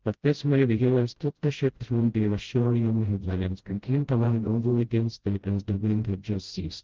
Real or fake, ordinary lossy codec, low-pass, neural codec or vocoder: fake; Opus, 16 kbps; 7.2 kHz; codec, 16 kHz, 0.5 kbps, FreqCodec, smaller model